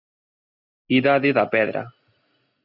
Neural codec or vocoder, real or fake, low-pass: none; real; 5.4 kHz